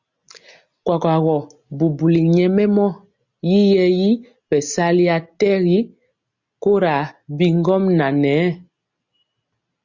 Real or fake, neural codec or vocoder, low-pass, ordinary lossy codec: real; none; 7.2 kHz; Opus, 64 kbps